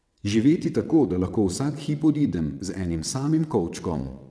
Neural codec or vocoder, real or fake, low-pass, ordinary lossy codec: vocoder, 22.05 kHz, 80 mel bands, WaveNeXt; fake; none; none